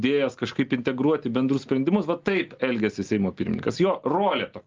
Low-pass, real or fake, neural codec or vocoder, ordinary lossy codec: 7.2 kHz; real; none; Opus, 32 kbps